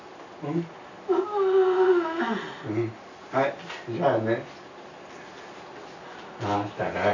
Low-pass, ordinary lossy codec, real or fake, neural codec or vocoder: 7.2 kHz; none; real; none